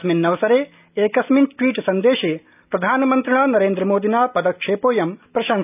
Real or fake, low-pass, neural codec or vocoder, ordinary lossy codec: real; 3.6 kHz; none; none